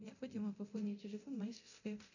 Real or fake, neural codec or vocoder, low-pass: fake; codec, 24 kHz, 0.9 kbps, DualCodec; 7.2 kHz